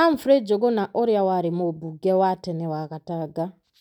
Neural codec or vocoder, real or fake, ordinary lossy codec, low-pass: none; real; none; 19.8 kHz